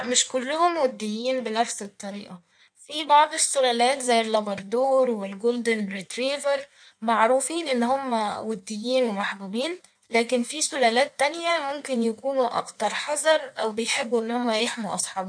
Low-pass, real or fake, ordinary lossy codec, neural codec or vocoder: 9.9 kHz; fake; none; codec, 16 kHz in and 24 kHz out, 1.1 kbps, FireRedTTS-2 codec